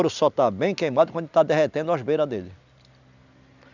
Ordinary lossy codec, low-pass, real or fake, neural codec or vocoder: none; 7.2 kHz; real; none